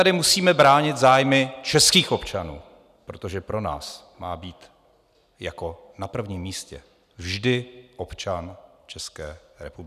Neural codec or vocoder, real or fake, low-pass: none; real; 14.4 kHz